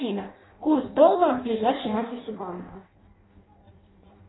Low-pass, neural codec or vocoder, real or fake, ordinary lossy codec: 7.2 kHz; codec, 16 kHz in and 24 kHz out, 0.6 kbps, FireRedTTS-2 codec; fake; AAC, 16 kbps